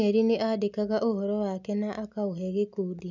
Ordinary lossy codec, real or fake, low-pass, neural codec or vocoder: none; real; 7.2 kHz; none